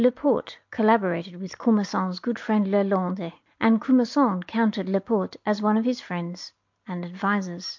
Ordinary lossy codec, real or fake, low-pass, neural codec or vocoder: MP3, 48 kbps; real; 7.2 kHz; none